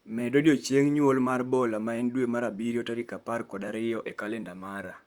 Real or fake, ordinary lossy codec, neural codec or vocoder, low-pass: fake; Opus, 64 kbps; vocoder, 44.1 kHz, 128 mel bands, Pupu-Vocoder; 19.8 kHz